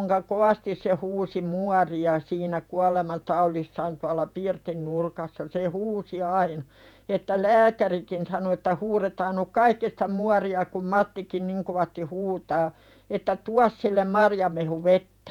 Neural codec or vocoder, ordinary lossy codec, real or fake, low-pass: vocoder, 48 kHz, 128 mel bands, Vocos; none; fake; 19.8 kHz